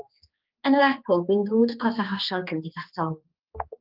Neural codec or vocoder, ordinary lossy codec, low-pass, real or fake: codec, 16 kHz, 2 kbps, X-Codec, HuBERT features, trained on general audio; Opus, 24 kbps; 5.4 kHz; fake